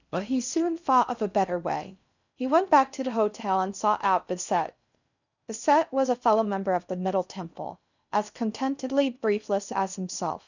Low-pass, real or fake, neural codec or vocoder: 7.2 kHz; fake; codec, 16 kHz in and 24 kHz out, 0.6 kbps, FocalCodec, streaming, 4096 codes